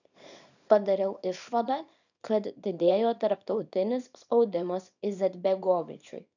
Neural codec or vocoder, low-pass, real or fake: codec, 24 kHz, 0.9 kbps, WavTokenizer, small release; 7.2 kHz; fake